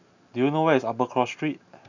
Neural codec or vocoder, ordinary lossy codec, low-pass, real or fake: none; none; 7.2 kHz; real